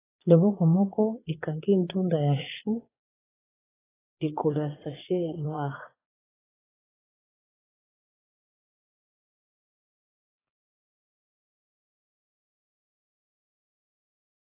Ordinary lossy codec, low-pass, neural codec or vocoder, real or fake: AAC, 16 kbps; 3.6 kHz; vocoder, 22.05 kHz, 80 mel bands, Vocos; fake